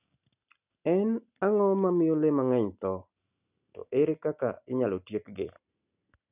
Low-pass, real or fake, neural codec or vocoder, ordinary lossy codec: 3.6 kHz; real; none; none